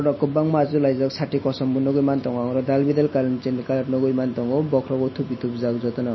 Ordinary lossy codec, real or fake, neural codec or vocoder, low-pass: MP3, 24 kbps; real; none; 7.2 kHz